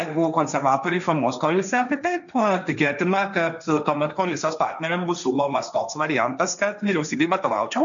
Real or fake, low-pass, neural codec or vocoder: fake; 7.2 kHz; codec, 16 kHz, 1.1 kbps, Voila-Tokenizer